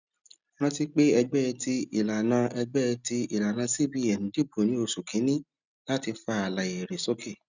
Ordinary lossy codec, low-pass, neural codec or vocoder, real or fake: none; 7.2 kHz; none; real